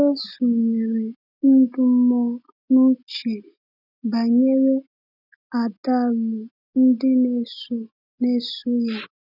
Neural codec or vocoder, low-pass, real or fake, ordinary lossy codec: none; 5.4 kHz; real; none